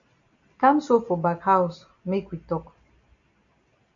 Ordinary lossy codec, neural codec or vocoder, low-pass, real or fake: AAC, 64 kbps; none; 7.2 kHz; real